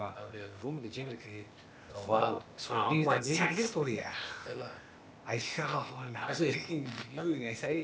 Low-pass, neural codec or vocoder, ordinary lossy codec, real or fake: none; codec, 16 kHz, 0.8 kbps, ZipCodec; none; fake